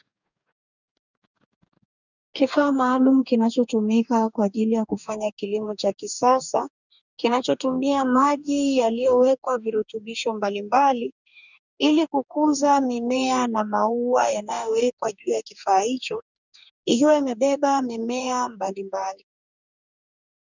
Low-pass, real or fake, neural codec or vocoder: 7.2 kHz; fake; codec, 44.1 kHz, 2.6 kbps, DAC